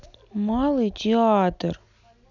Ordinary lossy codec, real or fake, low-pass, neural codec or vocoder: none; real; 7.2 kHz; none